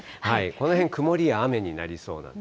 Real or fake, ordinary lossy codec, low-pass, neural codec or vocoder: real; none; none; none